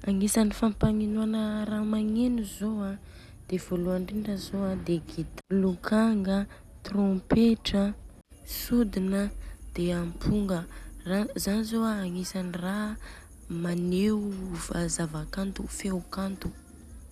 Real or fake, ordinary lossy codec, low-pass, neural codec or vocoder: real; none; 14.4 kHz; none